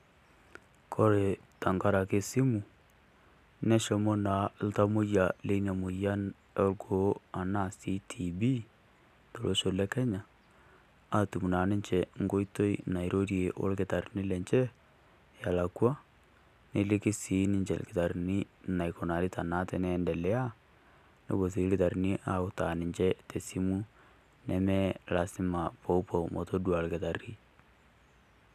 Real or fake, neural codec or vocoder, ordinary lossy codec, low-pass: real; none; none; 14.4 kHz